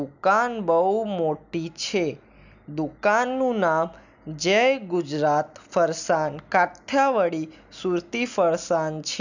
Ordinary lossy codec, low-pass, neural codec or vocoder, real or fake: none; 7.2 kHz; none; real